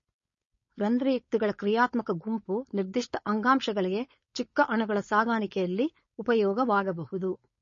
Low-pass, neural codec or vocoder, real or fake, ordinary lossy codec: 7.2 kHz; codec, 16 kHz, 4.8 kbps, FACodec; fake; MP3, 32 kbps